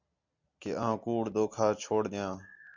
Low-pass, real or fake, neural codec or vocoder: 7.2 kHz; real; none